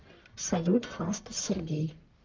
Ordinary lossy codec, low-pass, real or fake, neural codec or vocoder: Opus, 24 kbps; 7.2 kHz; fake; codec, 44.1 kHz, 1.7 kbps, Pupu-Codec